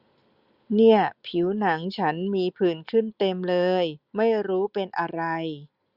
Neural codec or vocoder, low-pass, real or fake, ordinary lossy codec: none; 5.4 kHz; real; Opus, 64 kbps